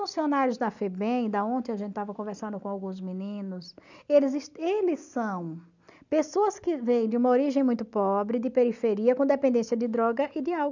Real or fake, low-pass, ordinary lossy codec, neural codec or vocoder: real; 7.2 kHz; none; none